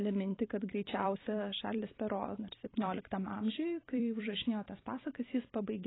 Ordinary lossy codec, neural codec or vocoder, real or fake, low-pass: AAC, 16 kbps; vocoder, 44.1 kHz, 128 mel bands every 256 samples, BigVGAN v2; fake; 7.2 kHz